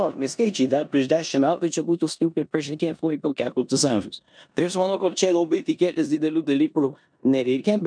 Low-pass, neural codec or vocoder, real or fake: 9.9 kHz; codec, 16 kHz in and 24 kHz out, 0.9 kbps, LongCat-Audio-Codec, four codebook decoder; fake